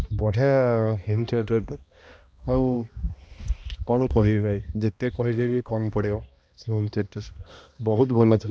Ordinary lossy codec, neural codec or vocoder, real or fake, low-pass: none; codec, 16 kHz, 1 kbps, X-Codec, HuBERT features, trained on balanced general audio; fake; none